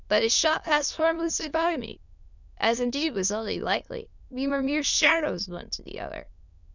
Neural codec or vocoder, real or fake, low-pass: autoencoder, 22.05 kHz, a latent of 192 numbers a frame, VITS, trained on many speakers; fake; 7.2 kHz